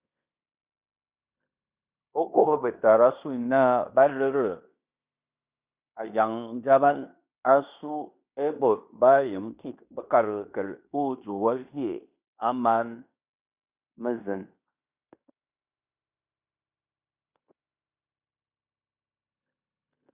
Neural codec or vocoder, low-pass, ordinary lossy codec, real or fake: codec, 16 kHz in and 24 kHz out, 0.9 kbps, LongCat-Audio-Codec, fine tuned four codebook decoder; 3.6 kHz; Opus, 64 kbps; fake